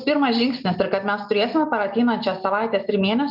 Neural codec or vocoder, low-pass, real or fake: none; 5.4 kHz; real